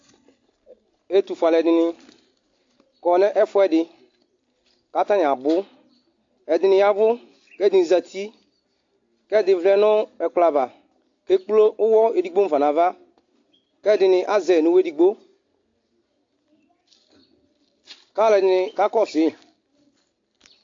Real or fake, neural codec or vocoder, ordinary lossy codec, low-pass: real; none; AAC, 48 kbps; 7.2 kHz